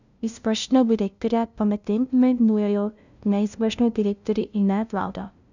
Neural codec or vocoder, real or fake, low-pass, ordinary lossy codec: codec, 16 kHz, 0.5 kbps, FunCodec, trained on LibriTTS, 25 frames a second; fake; 7.2 kHz; none